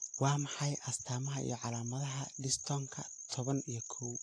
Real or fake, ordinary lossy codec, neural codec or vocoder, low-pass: real; AAC, 48 kbps; none; 9.9 kHz